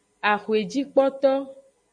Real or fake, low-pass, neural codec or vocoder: real; 9.9 kHz; none